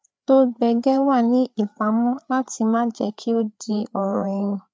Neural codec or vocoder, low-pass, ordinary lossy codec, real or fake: codec, 16 kHz, 4 kbps, FreqCodec, larger model; none; none; fake